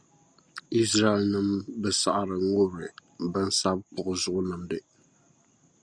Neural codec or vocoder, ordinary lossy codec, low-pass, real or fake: none; Opus, 64 kbps; 9.9 kHz; real